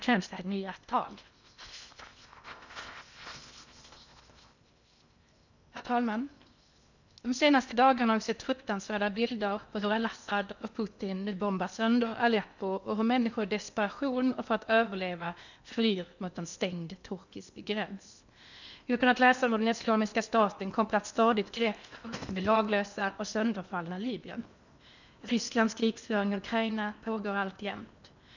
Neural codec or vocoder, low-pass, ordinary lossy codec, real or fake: codec, 16 kHz in and 24 kHz out, 0.8 kbps, FocalCodec, streaming, 65536 codes; 7.2 kHz; none; fake